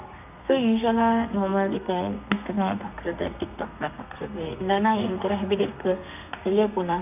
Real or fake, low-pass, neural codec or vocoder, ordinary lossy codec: fake; 3.6 kHz; codec, 32 kHz, 1.9 kbps, SNAC; none